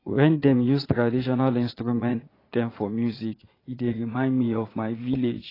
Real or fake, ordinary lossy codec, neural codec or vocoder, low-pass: fake; AAC, 24 kbps; vocoder, 22.05 kHz, 80 mel bands, Vocos; 5.4 kHz